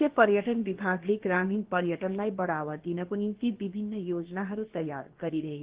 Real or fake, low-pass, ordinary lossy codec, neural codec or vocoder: fake; 3.6 kHz; Opus, 16 kbps; codec, 16 kHz, about 1 kbps, DyCAST, with the encoder's durations